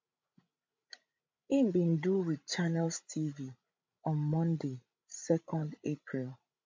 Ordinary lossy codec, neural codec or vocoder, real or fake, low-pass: MP3, 48 kbps; codec, 16 kHz, 16 kbps, FreqCodec, larger model; fake; 7.2 kHz